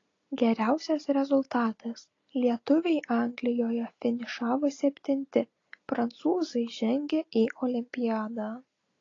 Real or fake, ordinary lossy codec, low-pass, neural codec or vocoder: real; AAC, 32 kbps; 7.2 kHz; none